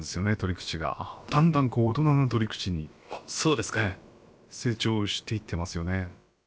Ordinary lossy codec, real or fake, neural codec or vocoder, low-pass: none; fake; codec, 16 kHz, about 1 kbps, DyCAST, with the encoder's durations; none